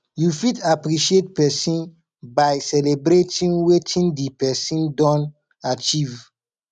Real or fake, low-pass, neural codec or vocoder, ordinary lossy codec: real; 9.9 kHz; none; none